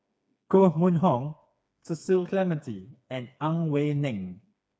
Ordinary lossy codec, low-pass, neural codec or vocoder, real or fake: none; none; codec, 16 kHz, 4 kbps, FreqCodec, smaller model; fake